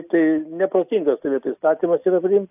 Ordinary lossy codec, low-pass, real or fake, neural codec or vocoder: AAC, 32 kbps; 3.6 kHz; real; none